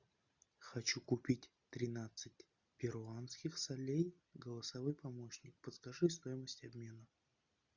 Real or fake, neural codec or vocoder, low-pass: real; none; 7.2 kHz